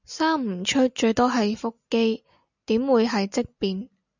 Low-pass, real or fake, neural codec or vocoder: 7.2 kHz; real; none